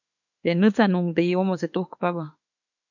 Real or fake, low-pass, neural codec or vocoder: fake; 7.2 kHz; autoencoder, 48 kHz, 32 numbers a frame, DAC-VAE, trained on Japanese speech